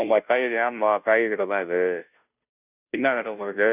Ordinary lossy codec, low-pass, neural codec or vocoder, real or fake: none; 3.6 kHz; codec, 16 kHz, 0.5 kbps, FunCodec, trained on Chinese and English, 25 frames a second; fake